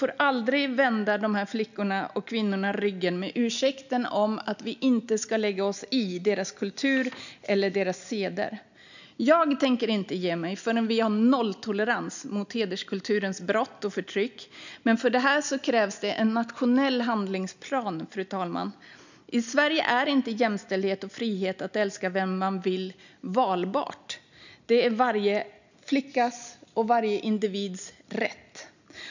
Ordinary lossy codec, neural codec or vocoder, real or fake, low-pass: none; none; real; 7.2 kHz